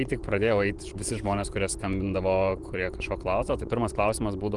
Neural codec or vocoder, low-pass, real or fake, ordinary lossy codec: vocoder, 24 kHz, 100 mel bands, Vocos; 10.8 kHz; fake; Opus, 24 kbps